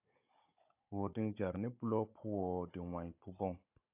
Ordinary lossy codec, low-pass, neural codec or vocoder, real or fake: AAC, 32 kbps; 3.6 kHz; codec, 16 kHz, 16 kbps, FunCodec, trained on Chinese and English, 50 frames a second; fake